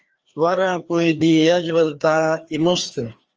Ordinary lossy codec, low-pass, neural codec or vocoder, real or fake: Opus, 24 kbps; 7.2 kHz; codec, 16 kHz, 2 kbps, FreqCodec, larger model; fake